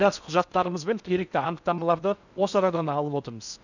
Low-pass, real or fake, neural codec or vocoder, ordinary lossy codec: 7.2 kHz; fake; codec, 16 kHz in and 24 kHz out, 0.6 kbps, FocalCodec, streaming, 4096 codes; none